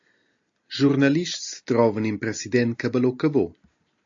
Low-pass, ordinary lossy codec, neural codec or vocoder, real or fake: 7.2 kHz; AAC, 48 kbps; none; real